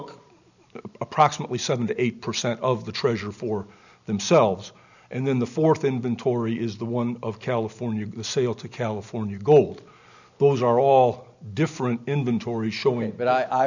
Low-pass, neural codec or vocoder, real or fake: 7.2 kHz; none; real